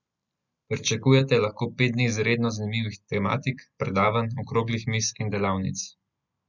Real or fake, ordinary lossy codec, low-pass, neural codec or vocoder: real; none; 7.2 kHz; none